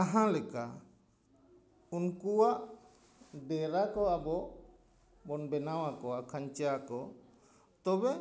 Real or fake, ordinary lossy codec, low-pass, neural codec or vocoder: real; none; none; none